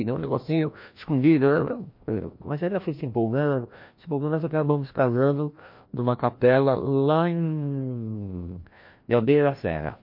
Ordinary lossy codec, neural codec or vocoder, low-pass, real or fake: MP3, 32 kbps; codec, 16 kHz, 1 kbps, FreqCodec, larger model; 5.4 kHz; fake